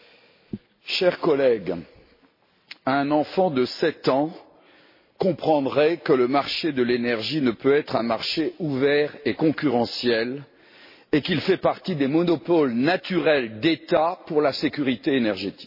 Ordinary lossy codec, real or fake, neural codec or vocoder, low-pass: MP3, 24 kbps; real; none; 5.4 kHz